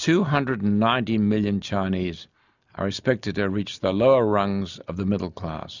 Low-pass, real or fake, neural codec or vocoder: 7.2 kHz; real; none